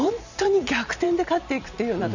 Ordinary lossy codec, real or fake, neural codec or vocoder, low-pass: none; fake; vocoder, 44.1 kHz, 128 mel bands every 512 samples, BigVGAN v2; 7.2 kHz